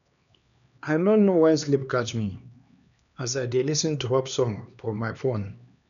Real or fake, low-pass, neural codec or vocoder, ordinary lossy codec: fake; 7.2 kHz; codec, 16 kHz, 2 kbps, X-Codec, HuBERT features, trained on LibriSpeech; none